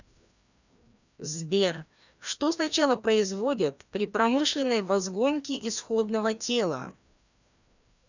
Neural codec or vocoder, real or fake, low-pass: codec, 16 kHz, 1 kbps, FreqCodec, larger model; fake; 7.2 kHz